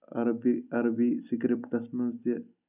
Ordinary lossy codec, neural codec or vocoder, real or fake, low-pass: none; none; real; 3.6 kHz